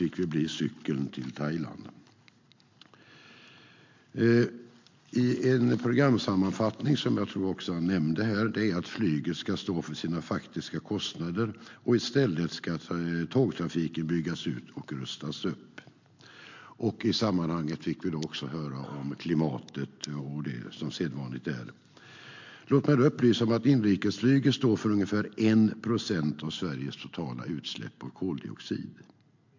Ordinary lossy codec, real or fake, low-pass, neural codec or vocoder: MP3, 48 kbps; real; 7.2 kHz; none